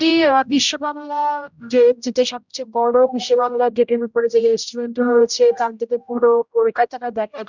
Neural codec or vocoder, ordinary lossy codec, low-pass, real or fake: codec, 16 kHz, 0.5 kbps, X-Codec, HuBERT features, trained on general audio; none; 7.2 kHz; fake